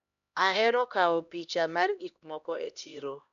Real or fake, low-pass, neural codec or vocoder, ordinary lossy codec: fake; 7.2 kHz; codec, 16 kHz, 1 kbps, X-Codec, HuBERT features, trained on LibriSpeech; none